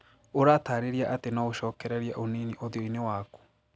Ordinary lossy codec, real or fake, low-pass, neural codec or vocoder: none; real; none; none